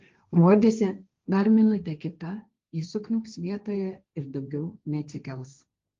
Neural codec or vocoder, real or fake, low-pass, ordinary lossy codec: codec, 16 kHz, 1.1 kbps, Voila-Tokenizer; fake; 7.2 kHz; Opus, 32 kbps